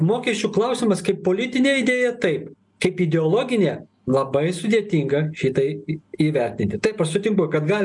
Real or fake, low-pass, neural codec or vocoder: real; 10.8 kHz; none